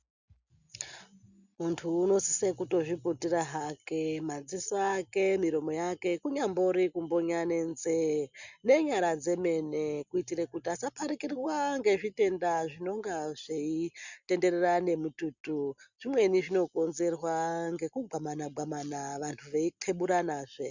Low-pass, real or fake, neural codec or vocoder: 7.2 kHz; real; none